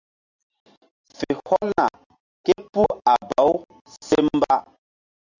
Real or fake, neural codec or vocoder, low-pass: real; none; 7.2 kHz